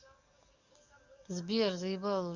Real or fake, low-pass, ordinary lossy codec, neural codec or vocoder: fake; 7.2 kHz; Opus, 64 kbps; codec, 44.1 kHz, 7.8 kbps, DAC